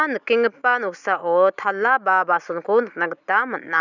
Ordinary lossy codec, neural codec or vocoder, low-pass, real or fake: none; none; 7.2 kHz; real